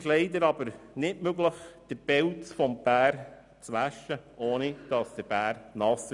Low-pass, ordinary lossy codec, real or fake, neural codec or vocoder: 10.8 kHz; none; real; none